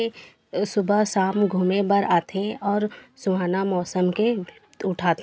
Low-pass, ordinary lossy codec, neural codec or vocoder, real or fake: none; none; none; real